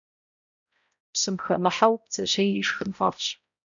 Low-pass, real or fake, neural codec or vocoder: 7.2 kHz; fake; codec, 16 kHz, 0.5 kbps, X-Codec, HuBERT features, trained on balanced general audio